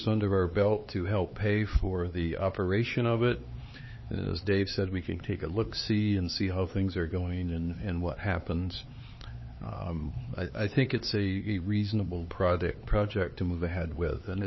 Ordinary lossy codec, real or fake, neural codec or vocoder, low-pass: MP3, 24 kbps; fake; codec, 16 kHz, 4 kbps, X-Codec, HuBERT features, trained on LibriSpeech; 7.2 kHz